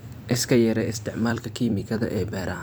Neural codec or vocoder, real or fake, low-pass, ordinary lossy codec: vocoder, 44.1 kHz, 128 mel bands every 256 samples, BigVGAN v2; fake; none; none